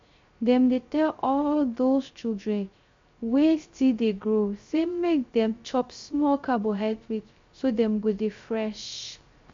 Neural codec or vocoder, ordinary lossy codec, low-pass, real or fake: codec, 16 kHz, 0.3 kbps, FocalCodec; MP3, 48 kbps; 7.2 kHz; fake